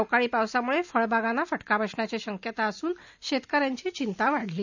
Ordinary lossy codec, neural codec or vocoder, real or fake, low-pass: none; none; real; 7.2 kHz